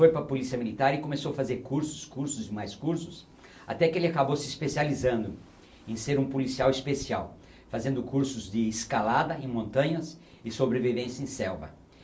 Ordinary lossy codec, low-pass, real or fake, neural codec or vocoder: none; none; real; none